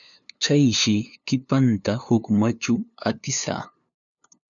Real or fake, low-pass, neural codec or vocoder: fake; 7.2 kHz; codec, 16 kHz, 2 kbps, FunCodec, trained on LibriTTS, 25 frames a second